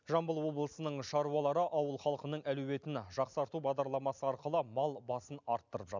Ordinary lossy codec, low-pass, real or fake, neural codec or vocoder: none; 7.2 kHz; real; none